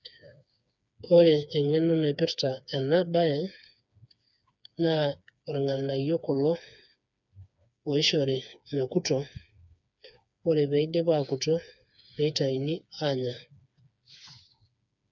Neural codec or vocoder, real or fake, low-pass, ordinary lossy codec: codec, 16 kHz, 4 kbps, FreqCodec, smaller model; fake; 7.2 kHz; none